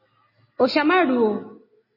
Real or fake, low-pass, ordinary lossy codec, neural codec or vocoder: real; 5.4 kHz; MP3, 24 kbps; none